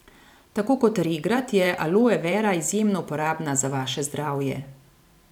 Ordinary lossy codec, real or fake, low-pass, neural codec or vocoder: none; fake; 19.8 kHz; vocoder, 44.1 kHz, 128 mel bands every 512 samples, BigVGAN v2